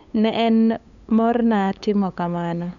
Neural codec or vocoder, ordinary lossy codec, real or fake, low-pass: codec, 16 kHz, 8 kbps, FunCodec, trained on LibriTTS, 25 frames a second; none; fake; 7.2 kHz